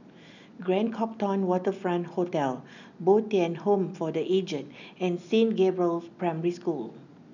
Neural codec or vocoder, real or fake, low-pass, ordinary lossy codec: none; real; 7.2 kHz; none